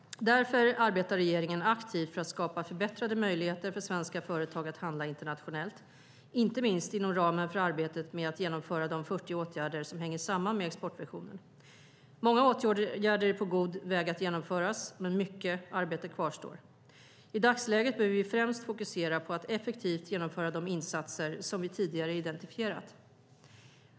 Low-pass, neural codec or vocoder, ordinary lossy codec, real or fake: none; none; none; real